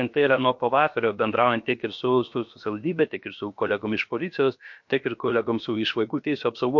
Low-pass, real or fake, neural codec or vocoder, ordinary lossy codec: 7.2 kHz; fake; codec, 16 kHz, about 1 kbps, DyCAST, with the encoder's durations; MP3, 48 kbps